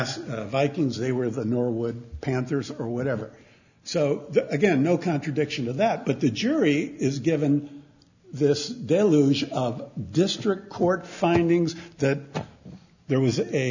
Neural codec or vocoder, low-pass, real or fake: none; 7.2 kHz; real